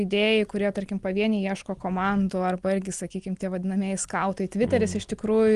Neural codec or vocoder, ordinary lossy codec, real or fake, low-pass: none; Opus, 24 kbps; real; 10.8 kHz